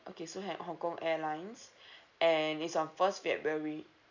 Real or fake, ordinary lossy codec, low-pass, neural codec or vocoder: real; none; 7.2 kHz; none